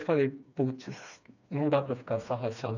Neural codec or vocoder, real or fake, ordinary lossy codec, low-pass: codec, 16 kHz, 2 kbps, FreqCodec, smaller model; fake; none; 7.2 kHz